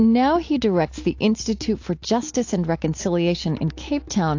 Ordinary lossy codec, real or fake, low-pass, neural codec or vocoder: AAC, 48 kbps; real; 7.2 kHz; none